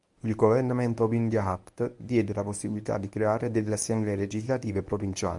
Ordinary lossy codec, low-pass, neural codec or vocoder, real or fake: MP3, 96 kbps; 10.8 kHz; codec, 24 kHz, 0.9 kbps, WavTokenizer, medium speech release version 1; fake